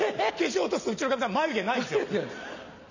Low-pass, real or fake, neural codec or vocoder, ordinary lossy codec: 7.2 kHz; real; none; none